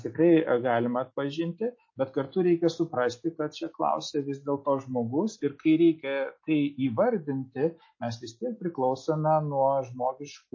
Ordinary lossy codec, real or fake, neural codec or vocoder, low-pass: MP3, 32 kbps; real; none; 7.2 kHz